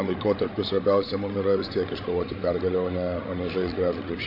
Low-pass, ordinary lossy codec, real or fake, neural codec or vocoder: 5.4 kHz; AAC, 32 kbps; fake; codec, 16 kHz, 16 kbps, FreqCodec, larger model